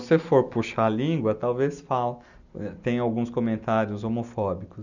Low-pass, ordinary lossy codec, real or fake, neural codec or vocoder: 7.2 kHz; none; real; none